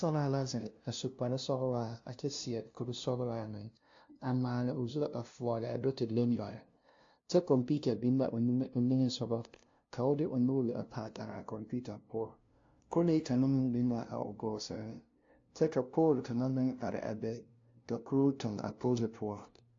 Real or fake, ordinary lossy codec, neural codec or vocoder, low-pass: fake; AAC, 48 kbps; codec, 16 kHz, 0.5 kbps, FunCodec, trained on LibriTTS, 25 frames a second; 7.2 kHz